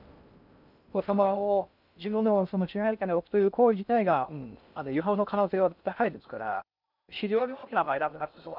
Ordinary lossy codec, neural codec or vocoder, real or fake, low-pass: none; codec, 16 kHz in and 24 kHz out, 0.6 kbps, FocalCodec, streaming, 2048 codes; fake; 5.4 kHz